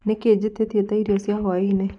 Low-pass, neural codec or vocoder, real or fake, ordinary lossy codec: 10.8 kHz; vocoder, 24 kHz, 100 mel bands, Vocos; fake; none